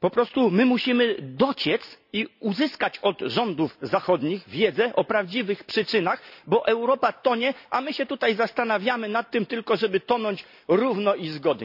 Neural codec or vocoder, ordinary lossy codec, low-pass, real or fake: none; none; 5.4 kHz; real